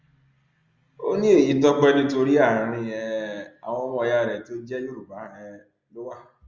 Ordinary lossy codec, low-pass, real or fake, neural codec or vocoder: Opus, 32 kbps; 7.2 kHz; real; none